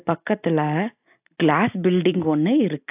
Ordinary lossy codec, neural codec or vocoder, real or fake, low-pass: AAC, 32 kbps; none; real; 3.6 kHz